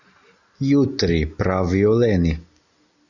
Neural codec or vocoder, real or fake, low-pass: none; real; 7.2 kHz